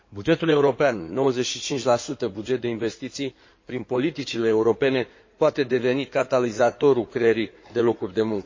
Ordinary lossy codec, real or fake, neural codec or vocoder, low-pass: MP3, 32 kbps; fake; codec, 16 kHz in and 24 kHz out, 2.2 kbps, FireRedTTS-2 codec; 7.2 kHz